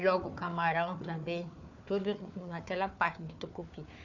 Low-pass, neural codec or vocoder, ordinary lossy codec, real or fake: 7.2 kHz; codec, 16 kHz, 4 kbps, FunCodec, trained on Chinese and English, 50 frames a second; none; fake